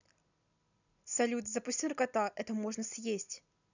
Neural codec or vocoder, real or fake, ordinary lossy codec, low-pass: none; real; none; 7.2 kHz